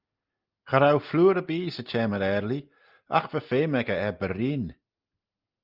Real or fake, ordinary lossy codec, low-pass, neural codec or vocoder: real; Opus, 24 kbps; 5.4 kHz; none